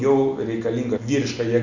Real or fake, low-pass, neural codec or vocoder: real; 7.2 kHz; none